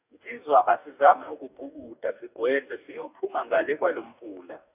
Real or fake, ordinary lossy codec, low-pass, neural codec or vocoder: fake; none; 3.6 kHz; codec, 44.1 kHz, 2.6 kbps, DAC